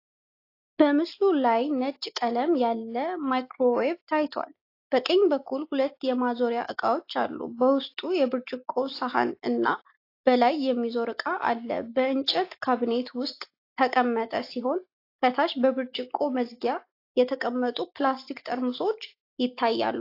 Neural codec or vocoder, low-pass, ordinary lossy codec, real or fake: none; 5.4 kHz; AAC, 32 kbps; real